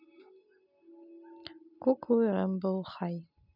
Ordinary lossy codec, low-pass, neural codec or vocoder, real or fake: none; 5.4 kHz; none; real